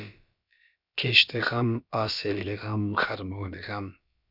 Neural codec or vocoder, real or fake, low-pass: codec, 16 kHz, about 1 kbps, DyCAST, with the encoder's durations; fake; 5.4 kHz